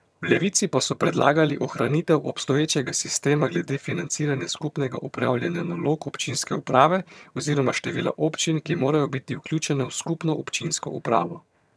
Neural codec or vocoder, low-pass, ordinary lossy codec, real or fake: vocoder, 22.05 kHz, 80 mel bands, HiFi-GAN; none; none; fake